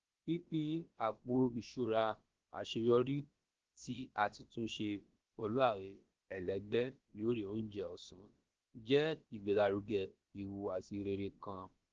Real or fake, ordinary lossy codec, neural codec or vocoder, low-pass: fake; Opus, 16 kbps; codec, 16 kHz, about 1 kbps, DyCAST, with the encoder's durations; 7.2 kHz